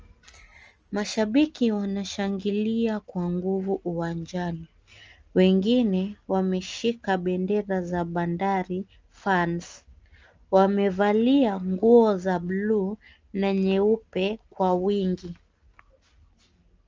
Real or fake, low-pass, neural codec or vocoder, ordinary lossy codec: real; 7.2 kHz; none; Opus, 24 kbps